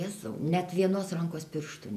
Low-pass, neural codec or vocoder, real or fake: 14.4 kHz; vocoder, 48 kHz, 128 mel bands, Vocos; fake